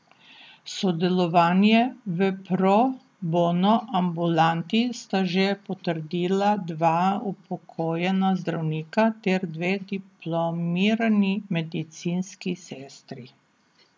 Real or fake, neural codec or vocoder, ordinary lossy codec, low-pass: real; none; none; none